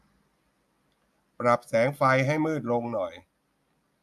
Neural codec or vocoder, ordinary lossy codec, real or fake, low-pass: none; none; real; 14.4 kHz